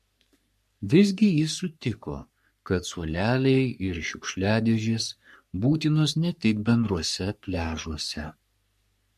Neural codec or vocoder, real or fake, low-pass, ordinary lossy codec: codec, 44.1 kHz, 3.4 kbps, Pupu-Codec; fake; 14.4 kHz; MP3, 64 kbps